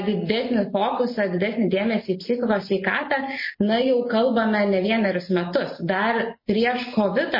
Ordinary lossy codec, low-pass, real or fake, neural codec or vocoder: MP3, 24 kbps; 5.4 kHz; real; none